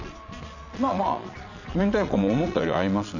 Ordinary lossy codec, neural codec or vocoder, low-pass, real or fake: none; vocoder, 22.05 kHz, 80 mel bands, Vocos; 7.2 kHz; fake